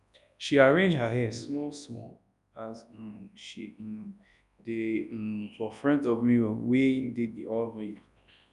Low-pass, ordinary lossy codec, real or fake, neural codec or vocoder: 10.8 kHz; none; fake; codec, 24 kHz, 0.9 kbps, WavTokenizer, large speech release